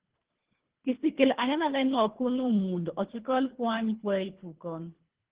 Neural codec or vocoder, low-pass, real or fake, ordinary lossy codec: codec, 24 kHz, 3 kbps, HILCodec; 3.6 kHz; fake; Opus, 16 kbps